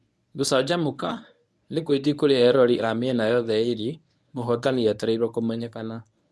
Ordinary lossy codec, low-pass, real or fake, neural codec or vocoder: none; none; fake; codec, 24 kHz, 0.9 kbps, WavTokenizer, medium speech release version 1